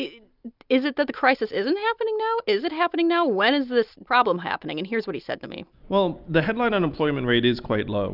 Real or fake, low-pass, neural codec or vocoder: real; 5.4 kHz; none